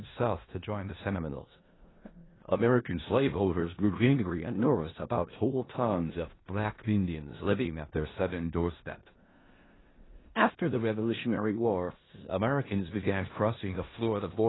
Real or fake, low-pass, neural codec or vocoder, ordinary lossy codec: fake; 7.2 kHz; codec, 16 kHz in and 24 kHz out, 0.4 kbps, LongCat-Audio-Codec, four codebook decoder; AAC, 16 kbps